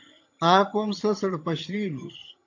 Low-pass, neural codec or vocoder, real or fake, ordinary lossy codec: 7.2 kHz; vocoder, 22.05 kHz, 80 mel bands, HiFi-GAN; fake; AAC, 48 kbps